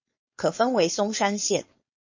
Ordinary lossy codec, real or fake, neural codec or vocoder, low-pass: MP3, 32 kbps; fake; codec, 16 kHz, 4.8 kbps, FACodec; 7.2 kHz